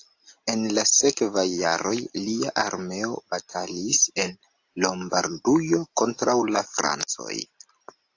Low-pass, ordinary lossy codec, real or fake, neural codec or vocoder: 7.2 kHz; AAC, 48 kbps; real; none